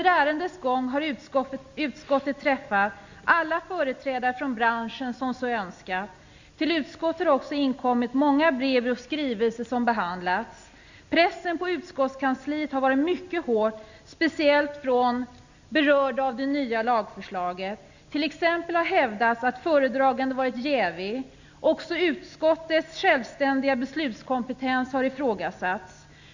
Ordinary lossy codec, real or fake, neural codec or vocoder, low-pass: Opus, 64 kbps; real; none; 7.2 kHz